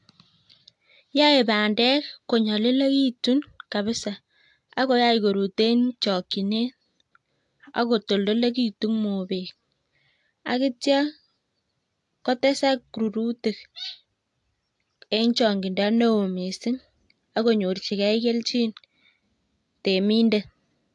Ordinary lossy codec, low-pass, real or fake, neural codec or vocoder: AAC, 64 kbps; 10.8 kHz; real; none